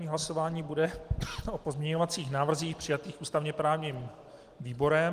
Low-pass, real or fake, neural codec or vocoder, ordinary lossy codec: 14.4 kHz; real; none; Opus, 24 kbps